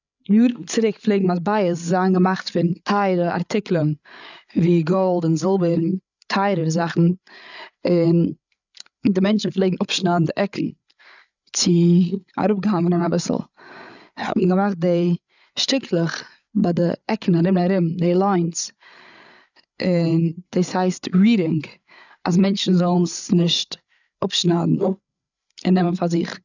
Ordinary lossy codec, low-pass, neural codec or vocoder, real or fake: none; 7.2 kHz; codec, 16 kHz, 8 kbps, FreqCodec, larger model; fake